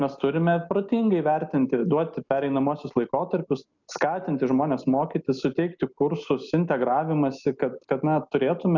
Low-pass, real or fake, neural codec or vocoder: 7.2 kHz; real; none